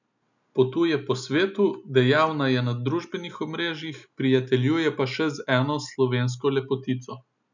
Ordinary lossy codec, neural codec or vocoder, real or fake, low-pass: none; none; real; 7.2 kHz